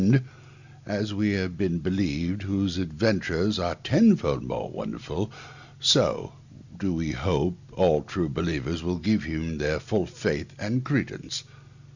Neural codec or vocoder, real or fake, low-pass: none; real; 7.2 kHz